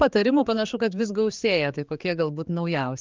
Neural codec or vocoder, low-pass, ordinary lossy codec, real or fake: codec, 16 kHz, 4 kbps, X-Codec, HuBERT features, trained on general audio; 7.2 kHz; Opus, 24 kbps; fake